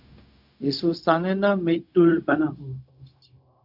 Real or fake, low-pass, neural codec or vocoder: fake; 5.4 kHz; codec, 16 kHz, 0.4 kbps, LongCat-Audio-Codec